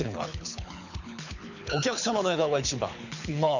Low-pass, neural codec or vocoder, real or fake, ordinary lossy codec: 7.2 kHz; codec, 24 kHz, 6 kbps, HILCodec; fake; none